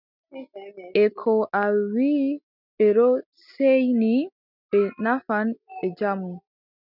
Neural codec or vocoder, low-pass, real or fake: none; 5.4 kHz; real